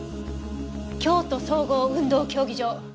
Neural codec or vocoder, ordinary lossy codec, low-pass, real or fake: none; none; none; real